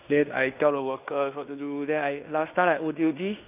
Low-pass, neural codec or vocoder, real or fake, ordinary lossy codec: 3.6 kHz; codec, 16 kHz in and 24 kHz out, 0.9 kbps, LongCat-Audio-Codec, fine tuned four codebook decoder; fake; none